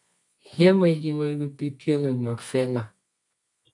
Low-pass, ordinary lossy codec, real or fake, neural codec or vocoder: 10.8 kHz; MP3, 64 kbps; fake; codec, 24 kHz, 0.9 kbps, WavTokenizer, medium music audio release